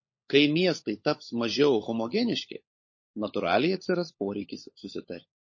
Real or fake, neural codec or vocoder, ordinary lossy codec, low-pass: fake; codec, 16 kHz, 16 kbps, FunCodec, trained on LibriTTS, 50 frames a second; MP3, 32 kbps; 7.2 kHz